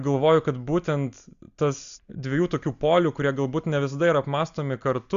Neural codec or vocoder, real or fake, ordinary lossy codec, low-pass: none; real; Opus, 64 kbps; 7.2 kHz